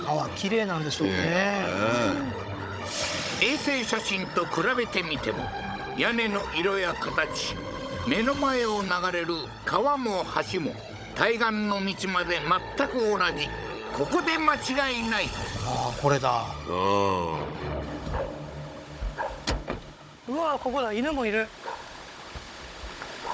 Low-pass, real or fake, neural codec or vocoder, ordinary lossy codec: none; fake; codec, 16 kHz, 16 kbps, FunCodec, trained on Chinese and English, 50 frames a second; none